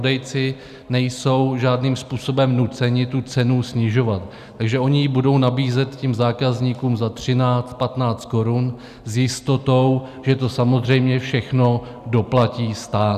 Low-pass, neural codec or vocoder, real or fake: 14.4 kHz; none; real